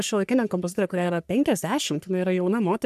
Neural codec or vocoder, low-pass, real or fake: codec, 44.1 kHz, 3.4 kbps, Pupu-Codec; 14.4 kHz; fake